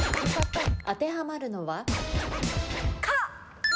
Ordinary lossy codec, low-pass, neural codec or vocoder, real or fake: none; none; none; real